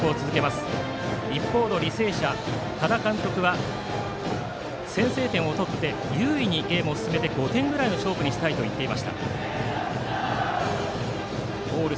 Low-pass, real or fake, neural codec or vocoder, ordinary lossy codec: none; real; none; none